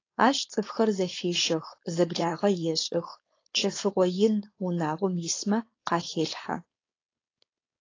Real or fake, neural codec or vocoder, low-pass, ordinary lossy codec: fake; codec, 16 kHz, 4.8 kbps, FACodec; 7.2 kHz; AAC, 32 kbps